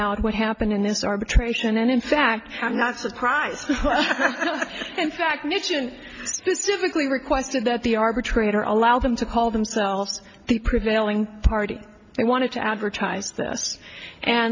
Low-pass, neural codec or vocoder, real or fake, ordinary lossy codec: 7.2 kHz; none; real; MP3, 48 kbps